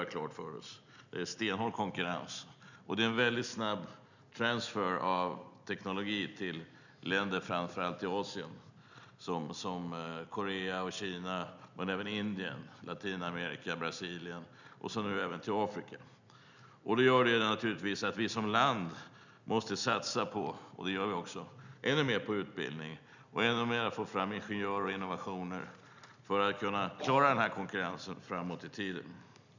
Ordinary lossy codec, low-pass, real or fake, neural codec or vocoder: none; 7.2 kHz; real; none